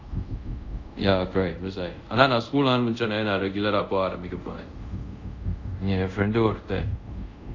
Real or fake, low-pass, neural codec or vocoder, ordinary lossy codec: fake; 7.2 kHz; codec, 24 kHz, 0.5 kbps, DualCodec; AAC, 48 kbps